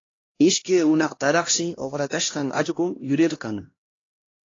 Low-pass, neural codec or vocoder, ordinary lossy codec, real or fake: 7.2 kHz; codec, 16 kHz, 1 kbps, X-Codec, HuBERT features, trained on LibriSpeech; AAC, 32 kbps; fake